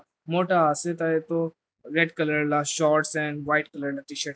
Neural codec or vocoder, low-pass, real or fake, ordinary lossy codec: none; none; real; none